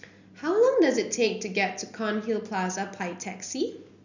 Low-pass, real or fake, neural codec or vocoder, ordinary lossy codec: 7.2 kHz; real; none; none